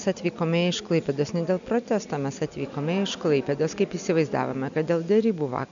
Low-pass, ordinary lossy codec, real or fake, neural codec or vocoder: 7.2 kHz; MP3, 96 kbps; real; none